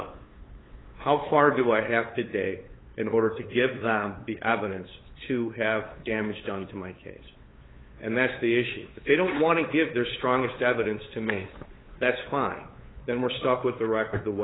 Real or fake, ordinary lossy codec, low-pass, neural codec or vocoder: fake; AAC, 16 kbps; 7.2 kHz; codec, 16 kHz, 8 kbps, FunCodec, trained on LibriTTS, 25 frames a second